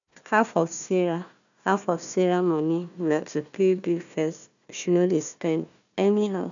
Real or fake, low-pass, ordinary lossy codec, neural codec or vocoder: fake; 7.2 kHz; none; codec, 16 kHz, 1 kbps, FunCodec, trained on Chinese and English, 50 frames a second